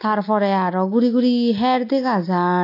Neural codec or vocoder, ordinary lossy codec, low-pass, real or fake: none; AAC, 32 kbps; 5.4 kHz; real